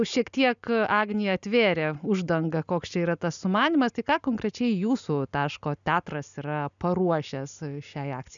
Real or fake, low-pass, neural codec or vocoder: real; 7.2 kHz; none